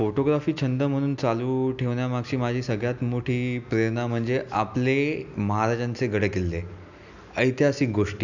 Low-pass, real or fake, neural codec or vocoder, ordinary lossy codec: 7.2 kHz; real; none; none